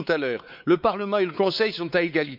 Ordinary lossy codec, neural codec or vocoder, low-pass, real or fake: none; codec, 16 kHz, 4 kbps, X-Codec, WavLM features, trained on Multilingual LibriSpeech; 5.4 kHz; fake